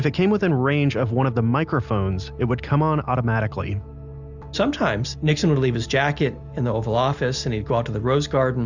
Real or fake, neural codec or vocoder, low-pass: real; none; 7.2 kHz